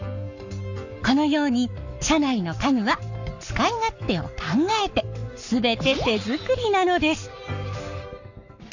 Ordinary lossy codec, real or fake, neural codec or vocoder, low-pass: none; fake; codec, 44.1 kHz, 7.8 kbps, Pupu-Codec; 7.2 kHz